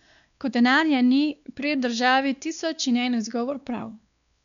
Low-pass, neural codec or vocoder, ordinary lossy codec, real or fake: 7.2 kHz; codec, 16 kHz, 2 kbps, X-Codec, WavLM features, trained on Multilingual LibriSpeech; none; fake